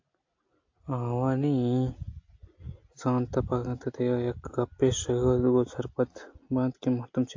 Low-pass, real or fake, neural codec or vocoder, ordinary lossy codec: 7.2 kHz; real; none; AAC, 48 kbps